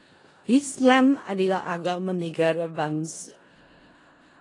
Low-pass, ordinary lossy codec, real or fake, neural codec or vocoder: 10.8 kHz; AAC, 32 kbps; fake; codec, 16 kHz in and 24 kHz out, 0.4 kbps, LongCat-Audio-Codec, four codebook decoder